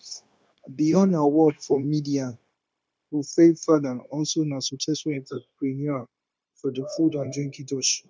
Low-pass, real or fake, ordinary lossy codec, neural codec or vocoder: none; fake; none; codec, 16 kHz, 0.9 kbps, LongCat-Audio-Codec